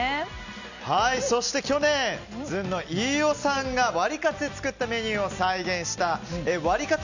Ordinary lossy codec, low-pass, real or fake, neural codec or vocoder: none; 7.2 kHz; real; none